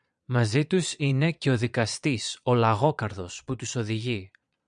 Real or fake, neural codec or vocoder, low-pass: fake; vocoder, 22.05 kHz, 80 mel bands, Vocos; 9.9 kHz